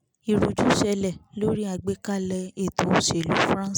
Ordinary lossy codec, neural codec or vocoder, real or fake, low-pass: none; none; real; none